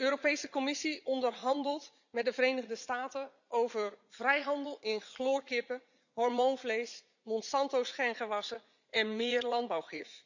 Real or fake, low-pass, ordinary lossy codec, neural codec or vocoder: fake; 7.2 kHz; none; vocoder, 44.1 kHz, 80 mel bands, Vocos